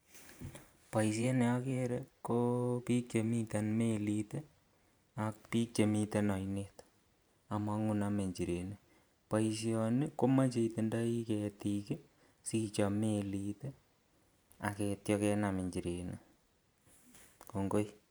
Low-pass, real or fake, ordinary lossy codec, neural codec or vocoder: none; fake; none; vocoder, 44.1 kHz, 128 mel bands every 512 samples, BigVGAN v2